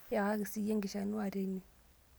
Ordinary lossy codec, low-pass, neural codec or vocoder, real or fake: none; none; none; real